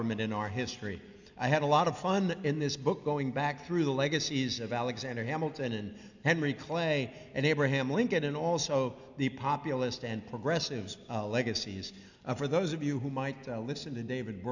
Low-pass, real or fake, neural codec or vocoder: 7.2 kHz; real; none